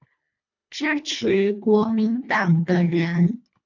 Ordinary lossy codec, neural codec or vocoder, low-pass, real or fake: MP3, 48 kbps; codec, 24 kHz, 1.5 kbps, HILCodec; 7.2 kHz; fake